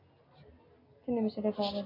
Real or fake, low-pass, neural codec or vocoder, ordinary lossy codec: real; 5.4 kHz; none; AAC, 24 kbps